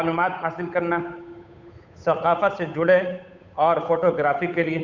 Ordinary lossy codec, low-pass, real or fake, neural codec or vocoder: none; 7.2 kHz; fake; codec, 16 kHz, 8 kbps, FunCodec, trained on Chinese and English, 25 frames a second